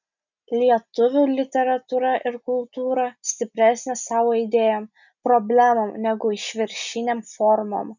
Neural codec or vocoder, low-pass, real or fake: none; 7.2 kHz; real